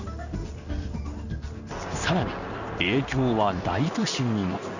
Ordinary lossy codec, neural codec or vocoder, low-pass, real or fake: none; codec, 16 kHz in and 24 kHz out, 1 kbps, XY-Tokenizer; 7.2 kHz; fake